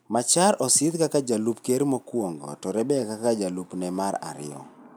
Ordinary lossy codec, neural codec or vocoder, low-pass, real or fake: none; none; none; real